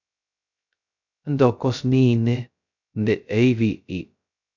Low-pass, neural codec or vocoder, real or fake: 7.2 kHz; codec, 16 kHz, 0.2 kbps, FocalCodec; fake